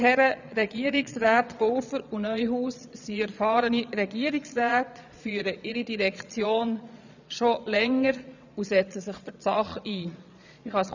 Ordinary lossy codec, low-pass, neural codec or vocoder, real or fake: none; 7.2 kHz; vocoder, 22.05 kHz, 80 mel bands, Vocos; fake